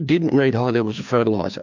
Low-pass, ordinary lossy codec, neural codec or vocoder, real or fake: 7.2 kHz; MP3, 64 kbps; codec, 16 kHz, 2 kbps, FreqCodec, larger model; fake